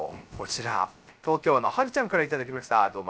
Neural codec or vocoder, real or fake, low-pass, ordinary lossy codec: codec, 16 kHz, 0.3 kbps, FocalCodec; fake; none; none